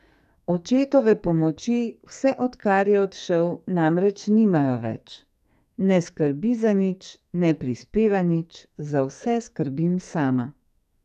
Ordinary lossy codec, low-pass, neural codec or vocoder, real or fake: none; 14.4 kHz; codec, 44.1 kHz, 2.6 kbps, SNAC; fake